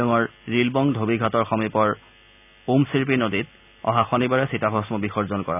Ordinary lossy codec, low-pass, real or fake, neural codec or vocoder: none; 3.6 kHz; real; none